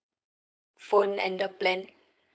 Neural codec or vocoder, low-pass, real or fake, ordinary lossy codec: codec, 16 kHz, 4.8 kbps, FACodec; none; fake; none